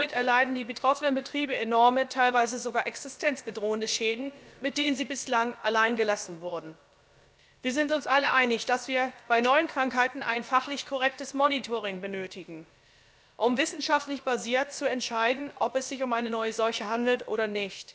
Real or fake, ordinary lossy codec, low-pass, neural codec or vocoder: fake; none; none; codec, 16 kHz, about 1 kbps, DyCAST, with the encoder's durations